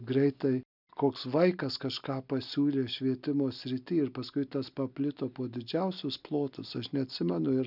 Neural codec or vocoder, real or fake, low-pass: none; real; 5.4 kHz